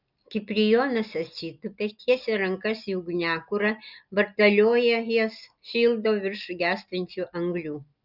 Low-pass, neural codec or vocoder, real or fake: 5.4 kHz; none; real